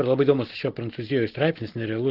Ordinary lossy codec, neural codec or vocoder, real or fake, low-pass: Opus, 16 kbps; none; real; 5.4 kHz